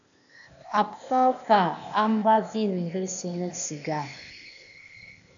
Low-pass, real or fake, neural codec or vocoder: 7.2 kHz; fake; codec, 16 kHz, 0.8 kbps, ZipCodec